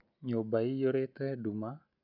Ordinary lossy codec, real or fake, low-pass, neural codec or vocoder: none; real; 5.4 kHz; none